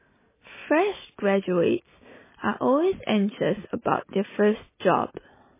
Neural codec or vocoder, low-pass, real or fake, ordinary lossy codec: none; 3.6 kHz; real; MP3, 16 kbps